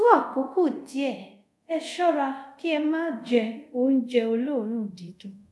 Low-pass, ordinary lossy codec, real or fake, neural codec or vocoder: none; none; fake; codec, 24 kHz, 0.5 kbps, DualCodec